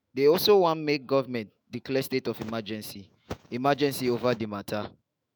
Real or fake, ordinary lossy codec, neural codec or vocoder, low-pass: fake; none; autoencoder, 48 kHz, 128 numbers a frame, DAC-VAE, trained on Japanese speech; none